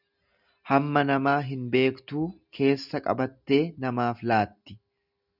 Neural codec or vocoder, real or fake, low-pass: none; real; 5.4 kHz